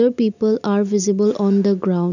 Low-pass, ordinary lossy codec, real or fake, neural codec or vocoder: 7.2 kHz; none; real; none